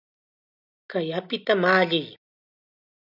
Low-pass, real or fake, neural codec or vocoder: 5.4 kHz; real; none